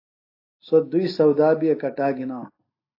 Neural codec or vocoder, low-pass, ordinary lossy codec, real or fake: none; 5.4 kHz; AAC, 32 kbps; real